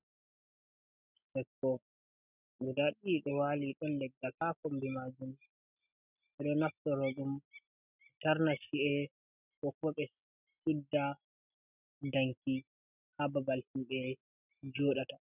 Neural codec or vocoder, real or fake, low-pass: none; real; 3.6 kHz